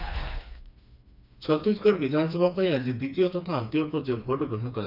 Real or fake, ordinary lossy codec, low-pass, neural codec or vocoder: fake; none; 5.4 kHz; codec, 16 kHz, 2 kbps, FreqCodec, smaller model